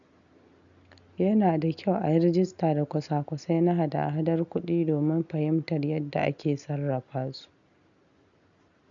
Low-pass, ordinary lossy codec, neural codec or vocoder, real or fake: 7.2 kHz; none; none; real